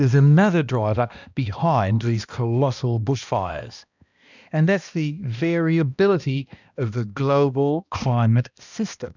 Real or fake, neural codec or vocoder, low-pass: fake; codec, 16 kHz, 1 kbps, X-Codec, HuBERT features, trained on balanced general audio; 7.2 kHz